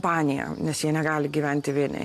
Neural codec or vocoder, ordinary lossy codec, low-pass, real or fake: none; AAC, 64 kbps; 14.4 kHz; real